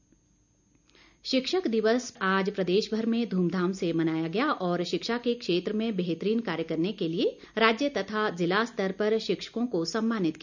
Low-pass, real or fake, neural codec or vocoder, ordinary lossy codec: 7.2 kHz; real; none; none